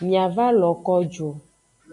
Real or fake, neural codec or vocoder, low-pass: real; none; 10.8 kHz